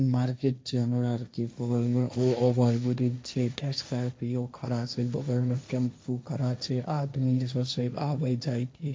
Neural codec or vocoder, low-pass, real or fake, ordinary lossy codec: codec, 16 kHz, 1.1 kbps, Voila-Tokenizer; none; fake; none